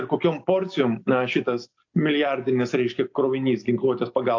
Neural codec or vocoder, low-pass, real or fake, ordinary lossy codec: none; 7.2 kHz; real; AAC, 48 kbps